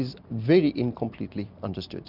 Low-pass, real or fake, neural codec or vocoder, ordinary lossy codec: 5.4 kHz; real; none; Opus, 64 kbps